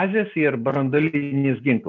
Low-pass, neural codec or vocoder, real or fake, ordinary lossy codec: 7.2 kHz; none; real; AAC, 64 kbps